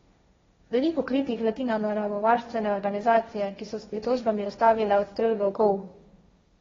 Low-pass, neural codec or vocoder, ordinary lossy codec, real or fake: 7.2 kHz; codec, 16 kHz, 1.1 kbps, Voila-Tokenizer; AAC, 24 kbps; fake